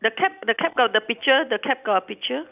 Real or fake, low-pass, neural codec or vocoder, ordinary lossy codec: real; 3.6 kHz; none; none